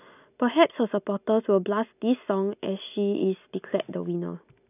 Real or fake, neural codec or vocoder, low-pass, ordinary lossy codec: real; none; 3.6 kHz; none